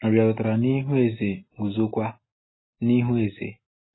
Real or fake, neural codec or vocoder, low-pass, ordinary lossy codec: real; none; 7.2 kHz; AAC, 16 kbps